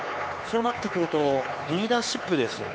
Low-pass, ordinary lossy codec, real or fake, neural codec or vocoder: none; none; fake; codec, 16 kHz, 4 kbps, X-Codec, HuBERT features, trained on LibriSpeech